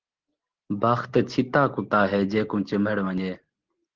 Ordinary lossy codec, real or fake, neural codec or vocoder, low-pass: Opus, 16 kbps; real; none; 7.2 kHz